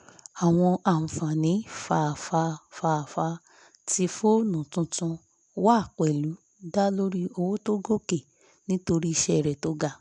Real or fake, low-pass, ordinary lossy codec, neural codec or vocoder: real; 10.8 kHz; none; none